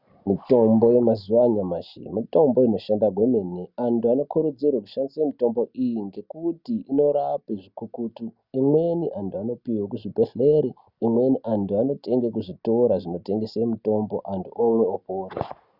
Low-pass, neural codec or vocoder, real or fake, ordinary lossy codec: 5.4 kHz; none; real; Opus, 64 kbps